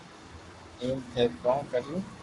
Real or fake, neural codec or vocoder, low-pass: fake; codec, 44.1 kHz, 7.8 kbps, Pupu-Codec; 10.8 kHz